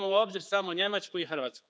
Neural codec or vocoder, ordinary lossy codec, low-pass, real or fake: codec, 16 kHz, 4 kbps, X-Codec, HuBERT features, trained on general audio; none; none; fake